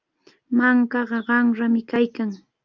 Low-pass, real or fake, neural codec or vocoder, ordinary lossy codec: 7.2 kHz; real; none; Opus, 24 kbps